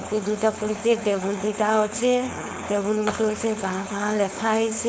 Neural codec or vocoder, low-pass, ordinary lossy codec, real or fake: codec, 16 kHz, 4.8 kbps, FACodec; none; none; fake